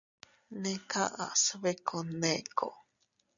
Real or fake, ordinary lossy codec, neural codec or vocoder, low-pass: real; MP3, 96 kbps; none; 7.2 kHz